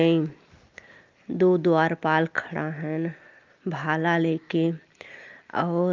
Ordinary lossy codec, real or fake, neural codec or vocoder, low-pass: Opus, 32 kbps; real; none; 7.2 kHz